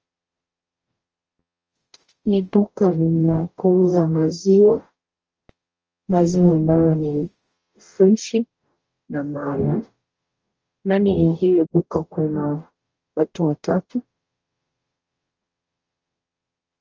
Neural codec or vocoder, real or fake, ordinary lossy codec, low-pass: codec, 44.1 kHz, 0.9 kbps, DAC; fake; Opus, 24 kbps; 7.2 kHz